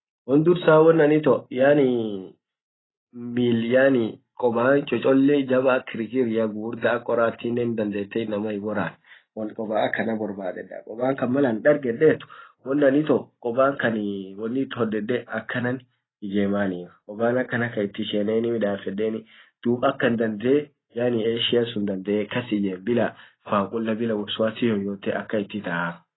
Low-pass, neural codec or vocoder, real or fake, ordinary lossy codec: 7.2 kHz; none; real; AAC, 16 kbps